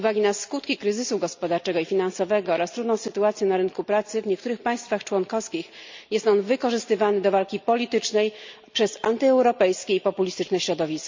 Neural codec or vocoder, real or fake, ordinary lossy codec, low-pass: none; real; MP3, 64 kbps; 7.2 kHz